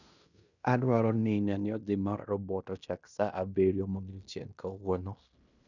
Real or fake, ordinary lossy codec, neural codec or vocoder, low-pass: fake; none; codec, 16 kHz in and 24 kHz out, 0.9 kbps, LongCat-Audio-Codec, fine tuned four codebook decoder; 7.2 kHz